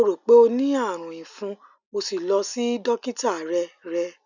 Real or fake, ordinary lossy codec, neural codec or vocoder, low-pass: real; none; none; 7.2 kHz